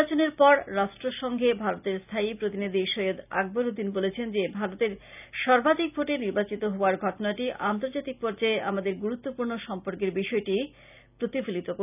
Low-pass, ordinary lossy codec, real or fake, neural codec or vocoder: 3.6 kHz; none; real; none